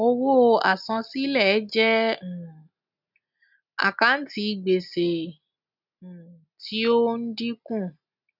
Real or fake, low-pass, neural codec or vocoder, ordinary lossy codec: real; 5.4 kHz; none; none